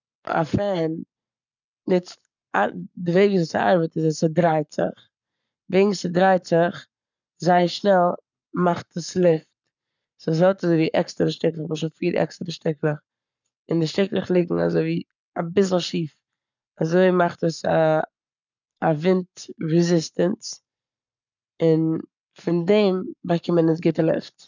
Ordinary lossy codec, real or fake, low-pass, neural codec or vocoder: none; fake; 7.2 kHz; codec, 44.1 kHz, 7.8 kbps, Pupu-Codec